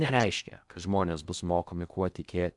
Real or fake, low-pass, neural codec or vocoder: fake; 10.8 kHz; codec, 16 kHz in and 24 kHz out, 0.6 kbps, FocalCodec, streaming, 2048 codes